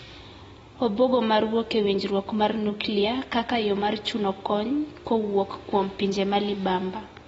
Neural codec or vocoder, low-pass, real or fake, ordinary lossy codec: none; 14.4 kHz; real; AAC, 24 kbps